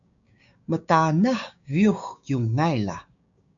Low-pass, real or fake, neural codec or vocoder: 7.2 kHz; fake; codec, 16 kHz, 6 kbps, DAC